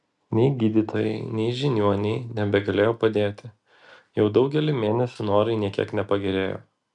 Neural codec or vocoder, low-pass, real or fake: none; 10.8 kHz; real